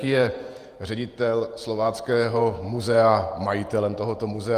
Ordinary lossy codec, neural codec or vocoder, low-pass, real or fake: Opus, 32 kbps; vocoder, 48 kHz, 128 mel bands, Vocos; 14.4 kHz; fake